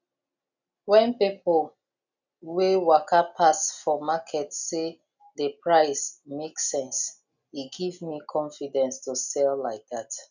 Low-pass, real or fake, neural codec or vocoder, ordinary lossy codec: 7.2 kHz; real; none; none